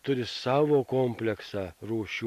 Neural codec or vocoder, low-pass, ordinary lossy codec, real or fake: none; 14.4 kHz; MP3, 64 kbps; real